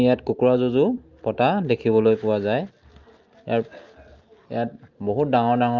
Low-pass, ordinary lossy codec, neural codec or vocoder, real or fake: 7.2 kHz; Opus, 24 kbps; none; real